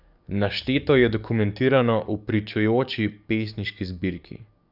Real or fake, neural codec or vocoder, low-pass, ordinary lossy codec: fake; codec, 44.1 kHz, 7.8 kbps, DAC; 5.4 kHz; none